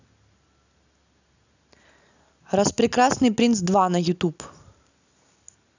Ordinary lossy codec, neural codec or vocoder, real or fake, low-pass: none; none; real; 7.2 kHz